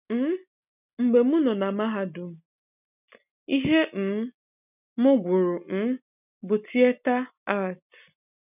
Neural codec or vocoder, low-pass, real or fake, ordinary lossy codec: none; 3.6 kHz; real; none